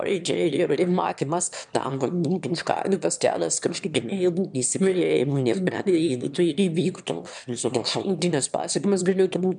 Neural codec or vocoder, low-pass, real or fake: autoencoder, 22.05 kHz, a latent of 192 numbers a frame, VITS, trained on one speaker; 9.9 kHz; fake